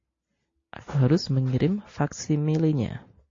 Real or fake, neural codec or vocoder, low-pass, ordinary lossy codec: real; none; 7.2 kHz; AAC, 32 kbps